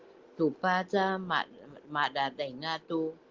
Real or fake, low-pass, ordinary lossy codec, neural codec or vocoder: real; 7.2 kHz; Opus, 16 kbps; none